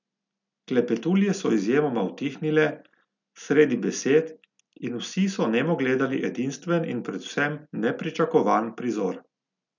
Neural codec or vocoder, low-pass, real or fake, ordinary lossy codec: none; 7.2 kHz; real; none